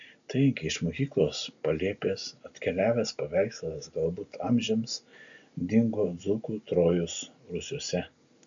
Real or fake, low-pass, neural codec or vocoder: real; 7.2 kHz; none